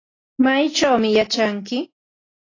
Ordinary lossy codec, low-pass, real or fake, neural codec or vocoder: AAC, 32 kbps; 7.2 kHz; real; none